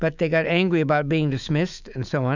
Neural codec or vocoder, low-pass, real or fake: autoencoder, 48 kHz, 128 numbers a frame, DAC-VAE, trained on Japanese speech; 7.2 kHz; fake